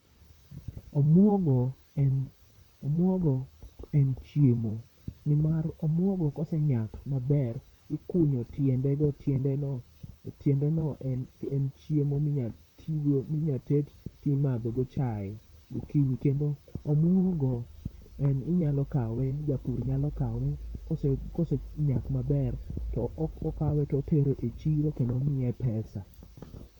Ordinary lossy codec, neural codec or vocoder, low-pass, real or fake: none; vocoder, 44.1 kHz, 128 mel bands, Pupu-Vocoder; 19.8 kHz; fake